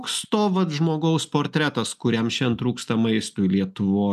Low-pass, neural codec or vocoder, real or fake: 14.4 kHz; none; real